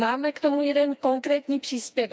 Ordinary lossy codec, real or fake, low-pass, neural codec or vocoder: none; fake; none; codec, 16 kHz, 2 kbps, FreqCodec, smaller model